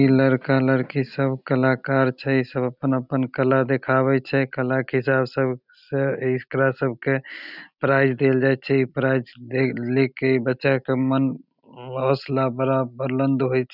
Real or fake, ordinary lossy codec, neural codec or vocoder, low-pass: real; none; none; 5.4 kHz